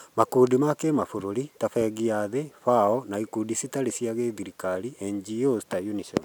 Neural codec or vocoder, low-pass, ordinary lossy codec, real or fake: none; none; none; real